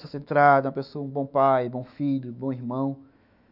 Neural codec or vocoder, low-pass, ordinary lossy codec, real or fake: autoencoder, 48 kHz, 128 numbers a frame, DAC-VAE, trained on Japanese speech; 5.4 kHz; none; fake